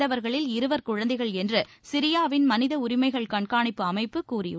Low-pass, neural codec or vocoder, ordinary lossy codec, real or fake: none; none; none; real